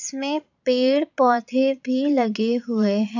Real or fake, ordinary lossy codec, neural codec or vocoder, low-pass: fake; none; vocoder, 22.05 kHz, 80 mel bands, Vocos; 7.2 kHz